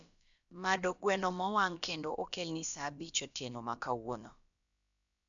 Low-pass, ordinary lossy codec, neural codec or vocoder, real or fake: 7.2 kHz; none; codec, 16 kHz, about 1 kbps, DyCAST, with the encoder's durations; fake